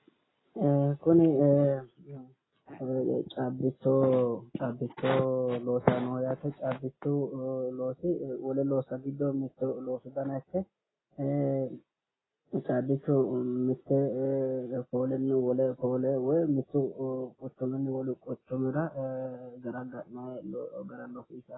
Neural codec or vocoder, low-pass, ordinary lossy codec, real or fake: none; 7.2 kHz; AAC, 16 kbps; real